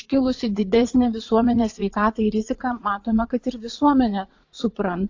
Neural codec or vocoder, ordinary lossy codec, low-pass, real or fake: vocoder, 44.1 kHz, 80 mel bands, Vocos; AAC, 48 kbps; 7.2 kHz; fake